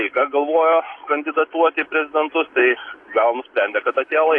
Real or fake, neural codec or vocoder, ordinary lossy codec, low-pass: real; none; AAC, 48 kbps; 10.8 kHz